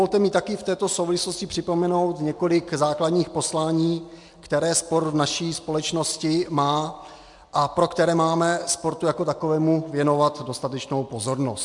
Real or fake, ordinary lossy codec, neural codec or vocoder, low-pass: real; MP3, 64 kbps; none; 10.8 kHz